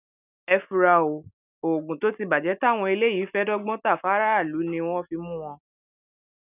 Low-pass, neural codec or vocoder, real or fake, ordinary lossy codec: 3.6 kHz; none; real; none